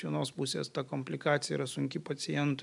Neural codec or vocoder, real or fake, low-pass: none; real; 10.8 kHz